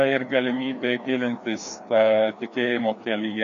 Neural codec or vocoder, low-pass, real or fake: codec, 16 kHz, 2 kbps, FreqCodec, larger model; 7.2 kHz; fake